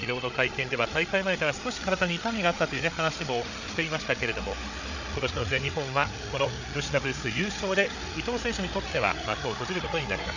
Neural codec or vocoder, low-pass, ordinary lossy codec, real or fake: codec, 16 kHz, 8 kbps, FreqCodec, larger model; 7.2 kHz; none; fake